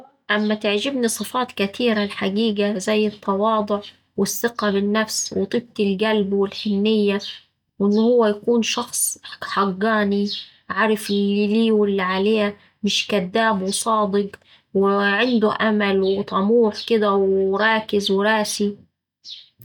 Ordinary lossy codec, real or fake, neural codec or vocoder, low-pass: none; real; none; 19.8 kHz